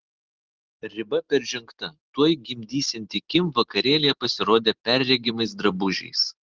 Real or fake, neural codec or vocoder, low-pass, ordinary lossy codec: real; none; 7.2 kHz; Opus, 16 kbps